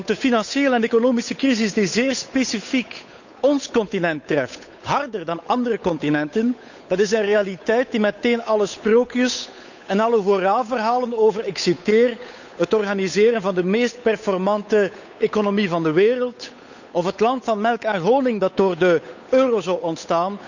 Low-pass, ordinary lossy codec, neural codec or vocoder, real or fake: 7.2 kHz; none; codec, 16 kHz, 8 kbps, FunCodec, trained on Chinese and English, 25 frames a second; fake